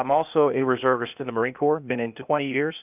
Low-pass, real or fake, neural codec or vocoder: 3.6 kHz; fake; codec, 16 kHz, 0.8 kbps, ZipCodec